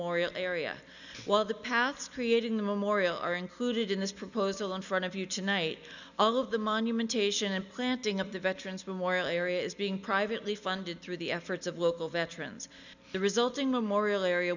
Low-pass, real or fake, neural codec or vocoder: 7.2 kHz; real; none